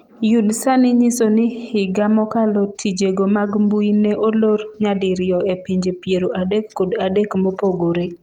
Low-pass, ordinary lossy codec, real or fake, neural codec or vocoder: 19.8 kHz; Opus, 32 kbps; real; none